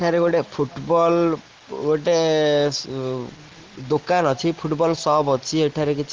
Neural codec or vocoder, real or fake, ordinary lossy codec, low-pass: none; real; Opus, 16 kbps; 7.2 kHz